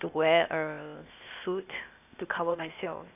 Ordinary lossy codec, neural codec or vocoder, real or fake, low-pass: none; codec, 16 kHz, 0.8 kbps, ZipCodec; fake; 3.6 kHz